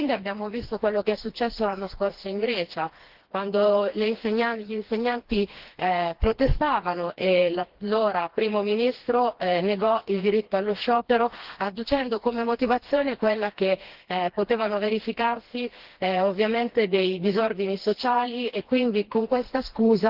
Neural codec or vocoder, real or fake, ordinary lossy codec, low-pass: codec, 16 kHz, 2 kbps, FreqCodec, smaller model; fake; Opus, 16 kbps; 5.4 kHz